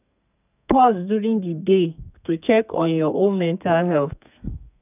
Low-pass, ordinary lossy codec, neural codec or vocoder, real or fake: 3.6 kHz; none; codec, 32 kHz, 1.9 kbps, SNAC; fake